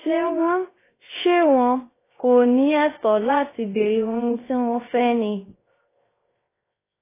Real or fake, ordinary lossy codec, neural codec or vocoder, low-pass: fake; AAC, 16 kbps; codec, 16 kHz, 0.3 kbps, FocalCodec; 3.6 kHz